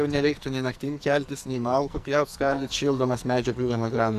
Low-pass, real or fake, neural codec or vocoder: 14.4 kHz; fake; codec, 44.1 kHz, 2.6 kbps, SNAC